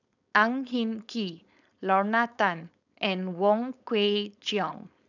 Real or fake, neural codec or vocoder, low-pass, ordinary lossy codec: fake; codec, 16 kHz, 4.8 kbps, FACodec; 7.2 kHz; none